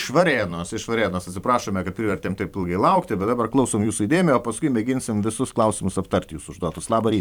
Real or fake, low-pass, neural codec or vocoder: fake; 19.8 kHz; vocoder, 44.1 kHz, 128 mel bands every 512 samples, BigVGAN v2